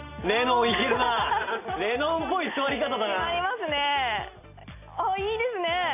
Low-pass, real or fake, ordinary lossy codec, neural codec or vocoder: 3.6 kHz; real; AAC, 32 kbps; none